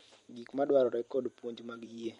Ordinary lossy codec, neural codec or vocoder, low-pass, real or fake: MP3, 48 kbps; vocoder, 44.1 kHz, 128 mel bands every 512 samples, BigVGAN v2; 19.8 kHz; fake